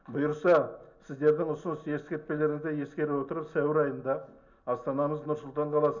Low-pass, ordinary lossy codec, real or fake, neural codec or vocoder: 7.2 kHz; none; real; none